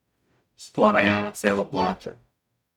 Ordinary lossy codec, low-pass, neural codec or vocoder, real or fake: none; 19.8 kHz; codec, 44.1 kHz, 0.9 kbps, DAC; fake